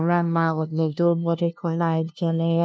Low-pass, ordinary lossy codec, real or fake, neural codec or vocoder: none; none; fake; codec, 16 kHz, 0.5 kbps, FunCodec, trained on LibriTTS, 25 frames a second